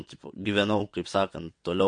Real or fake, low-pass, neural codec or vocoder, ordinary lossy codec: fake; 9.9 kHz; vocoder, 22.05 kHz, 80 mel bands, WaveNeXt; MP3, 48 kbps